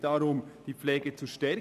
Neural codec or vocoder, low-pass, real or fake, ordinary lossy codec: none; 14.4 kHz; real; none